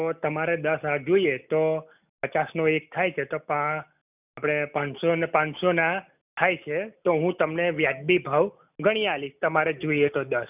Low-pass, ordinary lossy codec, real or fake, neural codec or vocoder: 3.6 kHz; none; real; none